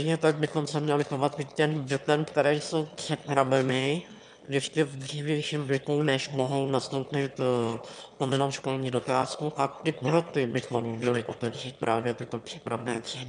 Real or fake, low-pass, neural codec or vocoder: fake; 9.9 kHz; autoencoder, 22.05 kHz, a latent of 192 numbers a frame, VITS, trained on one speaker